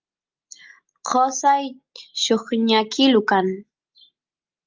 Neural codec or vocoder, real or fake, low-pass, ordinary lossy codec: none; real; 7.2 kHz; Opus, 32 kbps